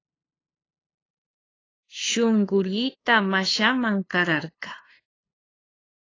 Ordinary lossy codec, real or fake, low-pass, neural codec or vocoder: AAC, 32 kbps; fake; 7.2 kHz; codec, 16 kHz, 2 kbps, FunCodec, trained on LibriTTS, 25 frames a second